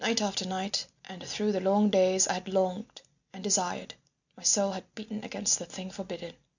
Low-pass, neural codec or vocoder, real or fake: 7.2 kHz; none; real